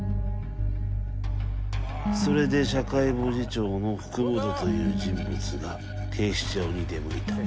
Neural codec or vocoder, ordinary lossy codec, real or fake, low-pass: none; none; real; none